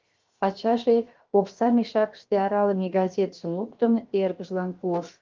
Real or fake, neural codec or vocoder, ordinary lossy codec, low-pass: fake; codec, 16 kHz, 0.7 kbps, FocalCodec; Opus, 32 kbps; 7.2 kHz